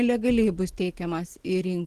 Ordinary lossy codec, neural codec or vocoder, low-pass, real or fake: Opus, 16 kbps; none; 14.4 kHz; real